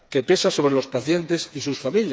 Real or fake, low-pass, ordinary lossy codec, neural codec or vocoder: fake; none; none; codec, 16 kHz, 4 kbps, FreqCodec, smaller model